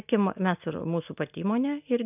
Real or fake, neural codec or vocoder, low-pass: real; none; 3.6 kHz